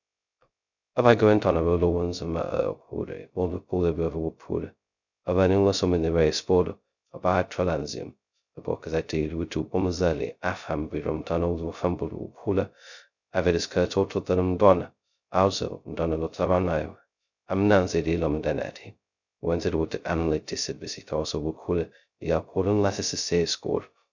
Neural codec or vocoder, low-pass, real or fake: codec, 16 kHz, 0.2 kbps, FocalCodec; 7.2 kHz; fake